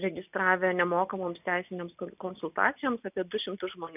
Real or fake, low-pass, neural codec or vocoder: real; 3.6 kHz; none